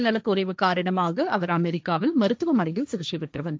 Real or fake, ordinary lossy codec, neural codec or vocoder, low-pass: fake; none; codec, 16 kHz, 1.1 kbps, Voila-Tokenizer; none